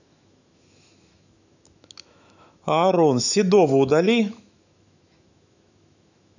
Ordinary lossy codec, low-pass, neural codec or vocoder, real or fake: none; 7.2 kHz; autoencoder, 48 kHz, 128 numbers a frame, DAC-VAE, trained on Japanese speech; fake